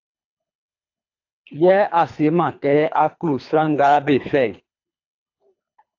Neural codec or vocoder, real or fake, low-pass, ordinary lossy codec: codec, 24 kHz, 3 kbps, HILCodec; fake; 7.2 kHz; AAC, 48 kbps